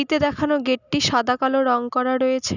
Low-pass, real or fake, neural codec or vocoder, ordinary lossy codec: 7.2 kHz; real; none; none